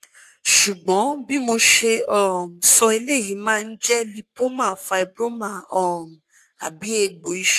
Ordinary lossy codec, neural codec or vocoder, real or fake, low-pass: AAC, 96 kbps; codec, 44.1 kHz, 3.4 kbps, Pupu-Codec; fake; 14.4 kHz